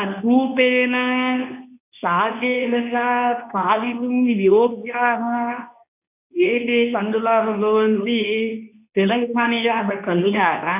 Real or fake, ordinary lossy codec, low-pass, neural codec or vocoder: fake; none; 3.6 kHz; codec, 24 kHz, 0.9 kbps, WavTokenizer, medium speech release version 2